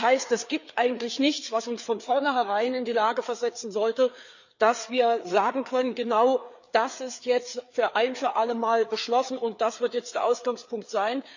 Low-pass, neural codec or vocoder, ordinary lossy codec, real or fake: 7.2 kHz; codec, 16 kHz, 4 kbps, FreqCodec, larger model; none; fake